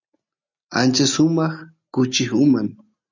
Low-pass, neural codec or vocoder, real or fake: 7.2 kHz; none; real